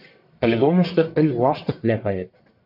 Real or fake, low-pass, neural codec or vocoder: fake; 5.4 kHz; codec, 44.1 kHz, 1.7 kbps, Pupu-Codec